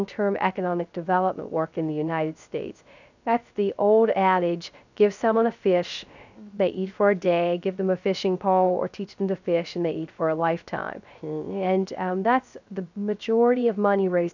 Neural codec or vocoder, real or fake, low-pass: codec, 16 kHz, 0.3 kbps, FocalCodec; fake; 7.2 kHz